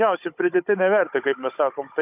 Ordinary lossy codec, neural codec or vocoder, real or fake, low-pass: AAC, 32 kbps; codec, 16 kHz, 16 kbps, FunCodec, trained on Chinese and English, 50 frames a second; fake; 3.6 kHz